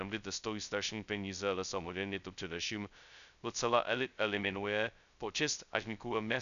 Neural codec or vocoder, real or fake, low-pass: codec, 16 kHz, 0.2 kbps, FocalCodec; fake; 7.2 kHz